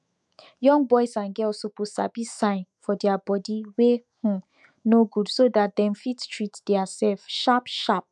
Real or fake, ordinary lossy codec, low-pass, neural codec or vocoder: fake; none; 10.8 kHz; autoencoder, 48 kHz, 128 numbers a frame, DAC-VAE, trained on Japanese speech